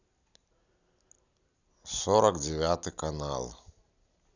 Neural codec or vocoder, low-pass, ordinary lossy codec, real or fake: none; 7.2 kHz; none; real